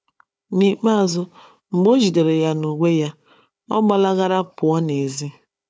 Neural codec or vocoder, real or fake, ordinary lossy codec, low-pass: codec, 16 kHz, 4 kbps, FunCodec, trained on Chinese and English, 50 frames a second; fake; none; none